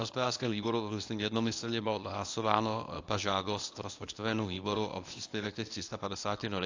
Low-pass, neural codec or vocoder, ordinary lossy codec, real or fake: 7.2 kHz; codec, 24 kHz, 0.9 kbps, WavTokenizer, medium speech release version 1; AAC, 48 kbps; fake